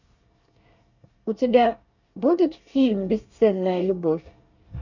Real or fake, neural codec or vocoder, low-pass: fake; codec, 24 kHz, 1 kbps, SNAC; 7.2 kHz